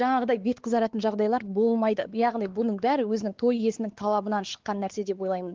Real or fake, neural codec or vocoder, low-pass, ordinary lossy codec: fake; codec, 16 kHz, 4 kbps, X-Codec, WavLM features, trained on Multilingual LibriSpeech; 7.2 kHz; Opus, 16 kbps